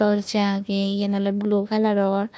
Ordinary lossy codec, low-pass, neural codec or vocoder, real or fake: none; none; codec, 16 kHz, 1 kbps, FunCodec, trained on Chinese and English, 50 frames a second; fake